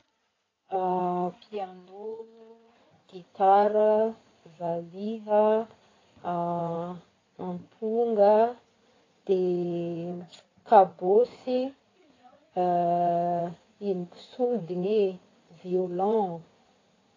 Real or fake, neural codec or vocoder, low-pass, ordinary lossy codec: fake; codec, 16 kHz in and 24 kHz out, 2.2 kbps, FireRedTTS-2 codec; 7.2 kHz; AAC, 32 kbps